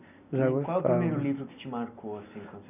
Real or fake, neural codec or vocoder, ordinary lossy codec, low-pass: real; none; none; 3.6 kHz